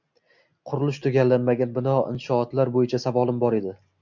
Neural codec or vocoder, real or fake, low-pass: none; real; 7.2 kHz